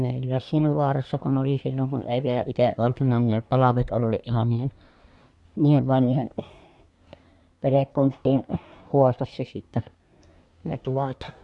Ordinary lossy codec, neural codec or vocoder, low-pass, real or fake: none; codec, 24 kHz, 1 kbps, SNAC; 10.8 kHz; fake